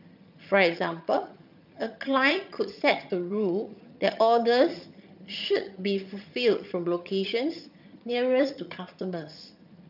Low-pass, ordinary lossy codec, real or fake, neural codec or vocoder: 5.4 kHz; none; fake; vocoder, 22.05 kHz, 80 mel bands, HiFi-GAN